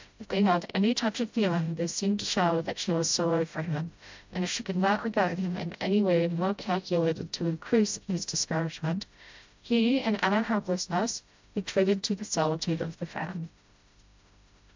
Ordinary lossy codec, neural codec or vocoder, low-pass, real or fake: MP3, 64 kbps; codec, 16 kHz, 0.5 kbps, FreqCodec, smaller model; 7.2 kHz; fake